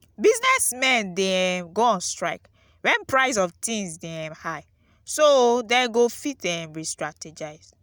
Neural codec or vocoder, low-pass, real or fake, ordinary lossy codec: none; none; real; none